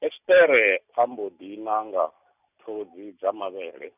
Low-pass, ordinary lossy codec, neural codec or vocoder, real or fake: 3.6 kHz; none; none; real